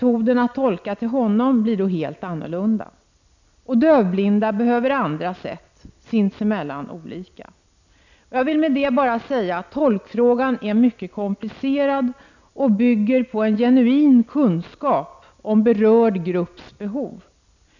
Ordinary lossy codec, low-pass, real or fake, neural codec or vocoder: none; 7.2 kHz; real; none